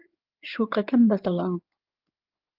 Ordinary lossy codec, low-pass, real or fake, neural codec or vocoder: Opus, 32 kbps; 5.4 kHz; fake; codec, 16 kHz in and 24 kHz out, 2.2 kbps, FireRedTTS-2 codec